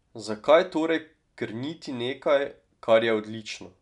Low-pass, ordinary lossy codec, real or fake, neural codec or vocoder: 10.8 kHz; none; real; none